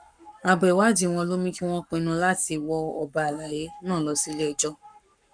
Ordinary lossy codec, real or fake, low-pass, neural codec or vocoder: none; fake; 9.9 kHz; codec, 44.1 kHz, 7.8 kbps, Pupu-Codec